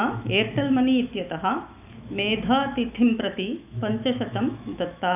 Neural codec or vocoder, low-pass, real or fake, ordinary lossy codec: autoencoder, 48 kHz, 128 numbers a frame, DAC-VAE, trained on Japanese speech; 3.6 kHz; fake; none